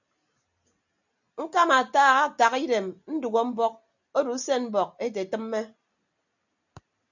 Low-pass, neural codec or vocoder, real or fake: 7.2 kHz; none; real